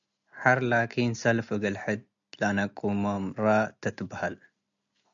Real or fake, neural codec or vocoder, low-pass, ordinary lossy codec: real; none; 7.2 kHz; MP3, 96 kbps